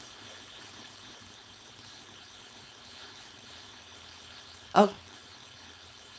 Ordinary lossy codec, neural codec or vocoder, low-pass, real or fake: none; codec, 16 kHz, 4.8 kbps, FACodec; none; fake